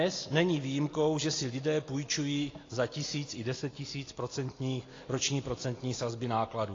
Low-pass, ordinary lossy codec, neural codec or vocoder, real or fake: 7.2 kHz; AAC, 32 kbps; none; real